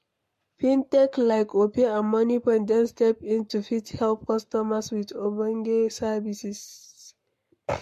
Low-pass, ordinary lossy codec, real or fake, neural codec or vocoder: 14.4 kHz; MP3, 64 kbps; fake; codec, 44.1 kHz, 7.8 kbps, Pupu-Codec